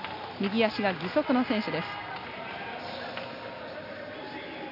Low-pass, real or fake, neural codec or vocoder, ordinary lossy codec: 5.4 kHz; real; none; AAC, 48 kbps